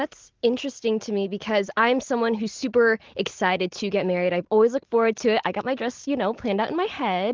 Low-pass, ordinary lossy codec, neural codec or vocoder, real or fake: 7.2 kHz; Opus, 16 kbps; none; real